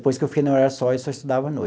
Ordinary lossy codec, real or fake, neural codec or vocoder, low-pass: none; real; none; none